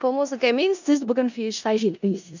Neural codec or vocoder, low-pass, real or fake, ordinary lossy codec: codec, 16 kHz in and 24 kHz out, 0.4 kbps, LongCat-Audio-Codec, four codebook decoder; 7.2 kHz; fake; none